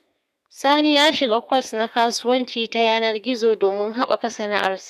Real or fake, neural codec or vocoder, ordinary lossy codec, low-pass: fake; codec, 32 kHz, 1.9 kbps, SNAC; none; 14.4 kHz